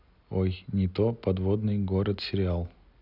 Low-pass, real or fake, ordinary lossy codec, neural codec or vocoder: 5.4 kHz; real; none; none